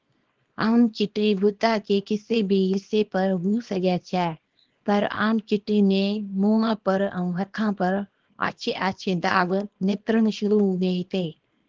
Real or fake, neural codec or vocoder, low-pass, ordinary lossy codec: fake; codec, 24 kHz, 0.9 kbps, WavTokenizer, small release; 7.2 kHz; Opus, 16 kbps